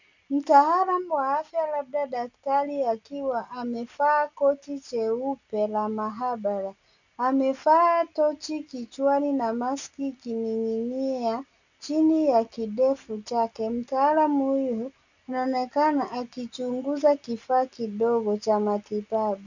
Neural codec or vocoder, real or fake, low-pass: none; real; 7.2 kHz